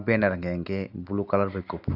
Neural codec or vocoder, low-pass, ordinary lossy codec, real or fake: none; 5.4 kHz; AAC, 48 kbps; real